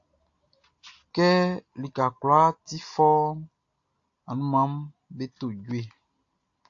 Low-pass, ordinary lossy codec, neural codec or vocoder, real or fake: 7.2 kHz; AAC, 48 kbps; none; real